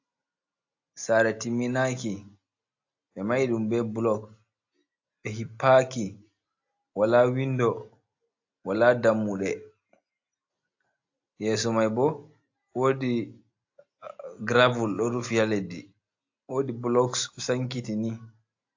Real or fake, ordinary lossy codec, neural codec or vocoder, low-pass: real; AAC, 48 kbps; none; 7.2 kHz